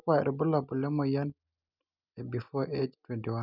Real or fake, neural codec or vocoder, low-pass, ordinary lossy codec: real; none; 5.4 kHz; none